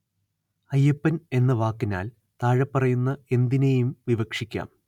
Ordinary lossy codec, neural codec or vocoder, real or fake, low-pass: none; none; real; 19.8 kHz